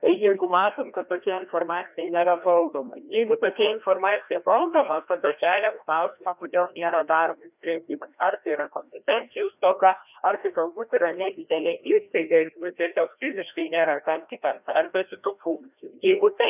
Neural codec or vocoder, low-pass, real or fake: codec, 16 kHz, 1 kbps, FreqCodec, larger model; 3.6 kHz; fake